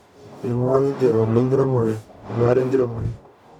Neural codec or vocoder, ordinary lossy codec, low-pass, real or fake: codec, 44.1 kHz, 0.9 kbps, DAC; none; 19.8 kHz; fake